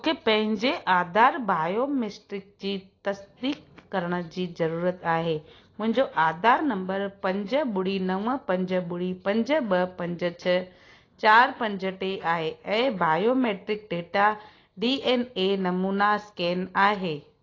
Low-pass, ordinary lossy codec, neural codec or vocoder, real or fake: 7.2 kHz; AAC, 32 kbps; none; real